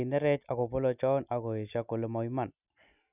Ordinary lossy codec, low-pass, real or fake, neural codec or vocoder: none; 3.6 kHz; real; none